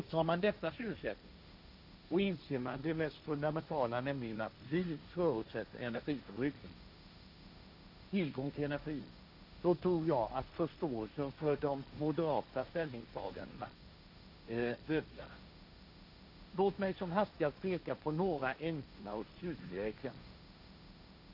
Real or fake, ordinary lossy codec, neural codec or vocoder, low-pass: fake; none; codec, 16 kHz, 1.1 kbps, Voila-Tokenizer; 5.4 kHz